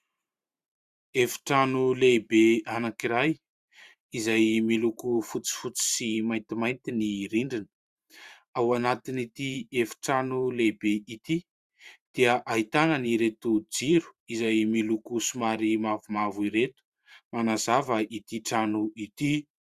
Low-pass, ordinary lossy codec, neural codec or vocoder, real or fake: 14.4 kHz; Opus, 64 kbps; vocoder, 44.1 kHz, 128 mel bands every 512 samples, BigVGAN v2; fake